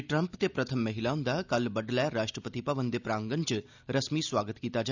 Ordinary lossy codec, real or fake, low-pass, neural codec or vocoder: none; real; 7.2 kHz; none